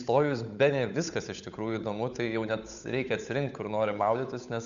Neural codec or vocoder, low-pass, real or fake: codec, 16 kHz, 8 kbps, FunCodec, trained on Chinese and English, 25 frames a second; 7.2 kHz; fake